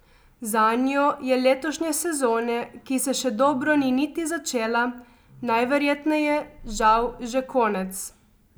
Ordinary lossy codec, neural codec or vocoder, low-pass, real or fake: none; none; none; real